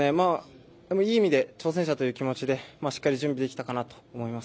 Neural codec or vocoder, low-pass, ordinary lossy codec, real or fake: none; none; none; real